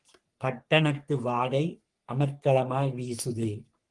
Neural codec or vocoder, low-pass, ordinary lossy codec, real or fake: codec, 44.1 kHz, 3.4 kbps, Pupu-Codec; 10.8 kHz; Opus, 24 kbps; fake